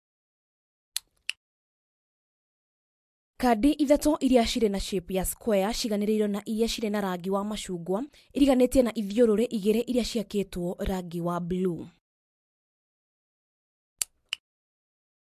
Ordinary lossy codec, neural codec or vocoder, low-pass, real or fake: MP3, 64 kbps; none; 14.4 kHz; real